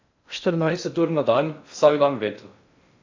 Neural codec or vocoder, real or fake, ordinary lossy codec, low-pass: codec, 16 kHz in and 24 kHz out, 0.6 kbps, FocalCodec, streaming, 2048 codes; fake; AAC, 48 kbps; 7.2 kHz